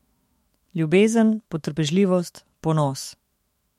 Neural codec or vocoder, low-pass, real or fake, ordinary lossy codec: autoencoder, 48 kHz, 128 numbers a frame, DAC-VAE, trained on Japanese speech; 19.8 kHz; fake; MP3, 64 kbps